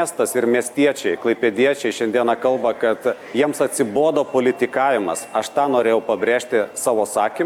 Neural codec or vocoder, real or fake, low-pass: none; real; 19.8 kHz